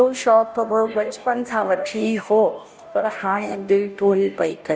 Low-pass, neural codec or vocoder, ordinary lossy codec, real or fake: none; codec, 16 kHz, 0.5 kbps, FunCodec, trained on Chinese and English, 25 frames a second; none; fake